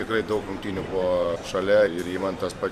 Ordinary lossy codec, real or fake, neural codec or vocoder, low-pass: MP3, 96 kbps; fake; vocoder, 44.1 kHz, 128 mel bands every 512 samples, BigVGAN v2; 14.4 kHz